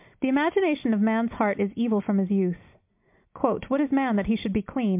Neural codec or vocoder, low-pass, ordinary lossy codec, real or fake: none; 3.6 kHz; MP3, 32 kbps; real